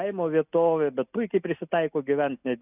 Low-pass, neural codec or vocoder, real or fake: 3.6 kHz; none; real